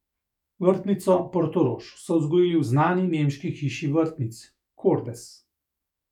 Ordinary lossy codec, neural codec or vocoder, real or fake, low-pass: none; autoencoder, 48 kHz, 128 numbers a frame, DAC-VAE, trained on Japanese speech; fake; 19.8 kHz